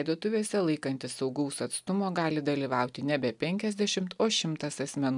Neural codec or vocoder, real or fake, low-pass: none; real; 10.8 kHz